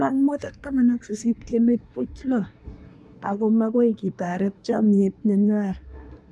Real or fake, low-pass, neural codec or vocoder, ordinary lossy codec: fake; none; codec, 24 kHz, 1 kbps, SNAC; none